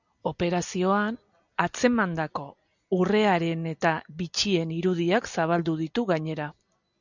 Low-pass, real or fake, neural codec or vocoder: 7.2 kHz; real; none